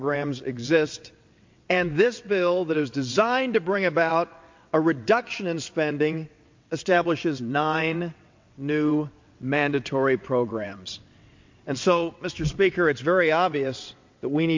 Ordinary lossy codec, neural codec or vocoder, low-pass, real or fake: MP3, 48 kbps; vocoder, 22.05 kHz, 80 mel bands, WaveNeXt; 7.2 kHz; fake